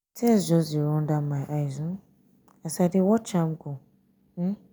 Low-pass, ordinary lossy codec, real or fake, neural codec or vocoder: none; none; real; none